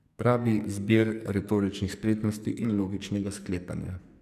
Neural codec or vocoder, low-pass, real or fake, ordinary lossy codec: codec, 44.1 kHz, 2.6 kbps, SNAC; 14.4 kHz; fake; none